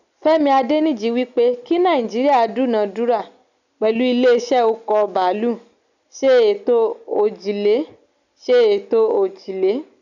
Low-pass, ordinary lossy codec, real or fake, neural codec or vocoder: 7.2 kHz; none; real; none